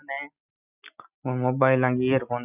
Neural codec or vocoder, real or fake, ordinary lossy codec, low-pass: none; real; none; 3.6 kHz